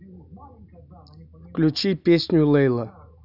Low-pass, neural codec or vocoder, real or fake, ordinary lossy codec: 5.4 kHz; none; real; none